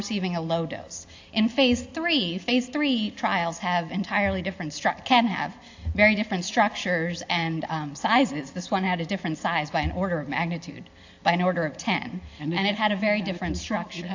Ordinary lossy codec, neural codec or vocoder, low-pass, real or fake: AAC, 48 kbps; none; 7.2 kHz; real